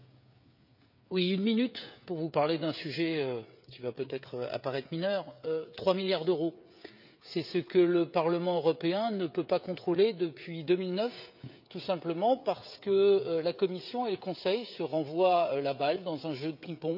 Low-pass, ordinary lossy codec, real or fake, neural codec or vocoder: 5.4 kHz; none; fake; codec, 16 kHz, 16 kbps, FreqCodec, smaller model